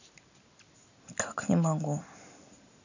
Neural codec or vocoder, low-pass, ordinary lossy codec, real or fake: none; 7.2 kHz; none; real